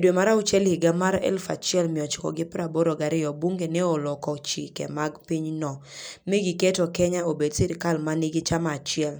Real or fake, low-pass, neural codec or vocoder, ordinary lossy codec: real; none; none; none